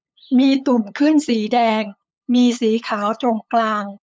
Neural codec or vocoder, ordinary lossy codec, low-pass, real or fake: codec, 16 kHz, 8 kbps, FunCodec, trained on LibriTTS, 25 frames a second; none; none; fake